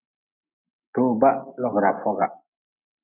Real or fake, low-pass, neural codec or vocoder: real; 3.6 kHz; none